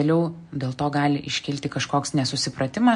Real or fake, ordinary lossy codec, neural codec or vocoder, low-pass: real; MP3, 48 kbps; none; 10.8 kHz